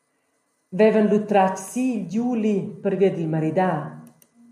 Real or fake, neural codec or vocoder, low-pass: real; none; 14.4 kHz